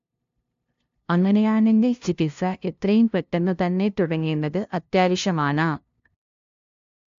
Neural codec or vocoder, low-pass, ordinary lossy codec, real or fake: codec, 16 kHz, 0.5 kbps, FunCodec, trained on LibriTTS, 25 frames a second; 7.2 kHz; AAC, 64 kbps; fake